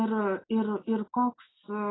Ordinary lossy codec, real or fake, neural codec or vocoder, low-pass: AAC, 16 kbps; real; none; 7.2 kHz